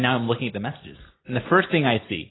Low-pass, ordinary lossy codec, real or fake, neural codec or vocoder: 7.2 kHz; AAC, 16 kbps; real; none